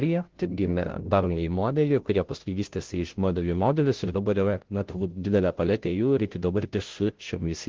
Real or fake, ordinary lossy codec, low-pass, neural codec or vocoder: fake; Opus, 16 kbps; 7.2 kHz; codec, 16 kHz, 0.5 kbps, FunCodec, trained on Chinese and English, 25 frames a second